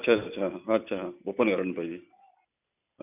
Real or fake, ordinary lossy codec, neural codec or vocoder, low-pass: real; none; none; 3.6 kHz